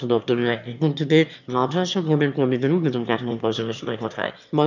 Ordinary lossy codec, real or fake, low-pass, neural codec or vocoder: none; fake; 7.2 kHz; autoencoder, 22.05 kHz, a latent of 192 numbers a frame, VITS, trained on one speaker